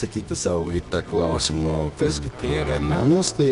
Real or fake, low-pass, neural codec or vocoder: fake; 10.8 kHz; codec, 24 kHz, 0.9 kbps, WavTokenizer, medium music audio release